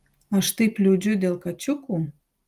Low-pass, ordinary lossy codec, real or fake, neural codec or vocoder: 14.4 kHz; Opus, 32 kbps; real; none